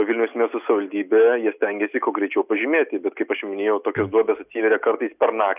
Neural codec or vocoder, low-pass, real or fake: none; 3.6 kHz; real